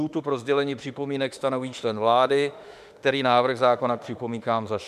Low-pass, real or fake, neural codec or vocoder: 14.4 kHz; fake; autoencoder, 48 kHz, 32 numbers a frame, DAC-VAE, trained on Japanese speech